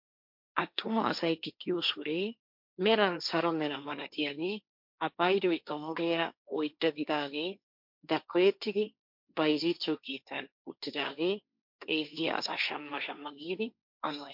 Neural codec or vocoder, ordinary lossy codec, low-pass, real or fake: codec, 16 kHz, 1.1 kbps, Voila-Tokenizer; MP3, 48 kbps; 5.4 kHz; fake